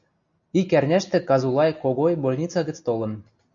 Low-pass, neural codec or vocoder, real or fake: 7.2 kHz; none; real